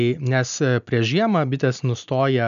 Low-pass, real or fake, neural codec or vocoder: 7.2 kHz; real; none